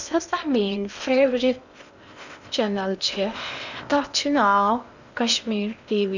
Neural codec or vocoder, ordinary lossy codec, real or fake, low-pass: codec, 16 kHz in and 24 kHz out, 0.8 kbps, FocalCodec, streaming, 65536 codes; none; fake; 7.2 kHz